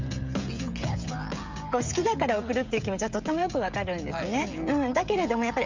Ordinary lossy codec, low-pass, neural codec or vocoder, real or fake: MP3, 64 kbps; 7.2 kHz; codec, 16 kHz, 16 kbps, FreqCodec, smaller model; fake